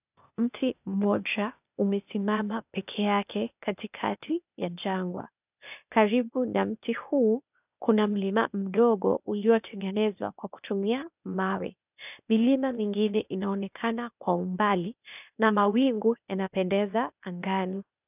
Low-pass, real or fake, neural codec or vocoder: 3.6 kHz; fake; codec, 16 kHz, 0.8 kbps, ZipCodec